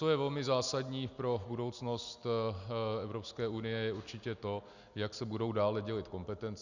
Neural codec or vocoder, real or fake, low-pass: none; real; 7.2 kHz